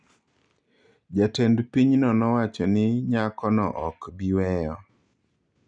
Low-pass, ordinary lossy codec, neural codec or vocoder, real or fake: 9.9 kHz; none; none; real